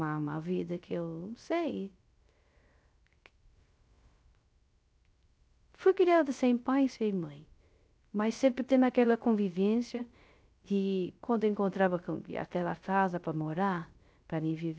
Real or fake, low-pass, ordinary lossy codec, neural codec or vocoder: fake; none; none; codec, 16 kHz, 0.3 kbps, FocalCodec